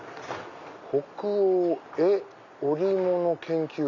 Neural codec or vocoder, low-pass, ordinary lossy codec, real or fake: none; 7.2 kHz; none; real